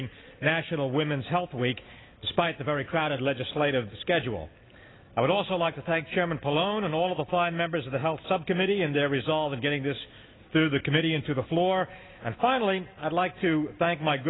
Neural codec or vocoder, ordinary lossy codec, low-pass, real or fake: none; AAC, 16 kbps; 7.2 kHz; real